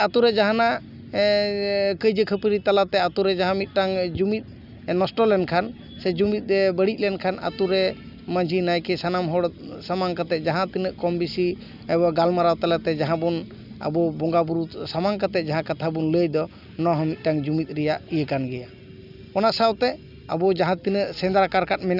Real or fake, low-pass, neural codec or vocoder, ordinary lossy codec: real; 5.4 kHz; none; none